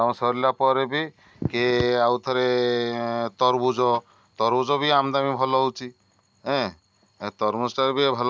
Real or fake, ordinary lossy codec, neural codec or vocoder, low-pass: real; none; none; none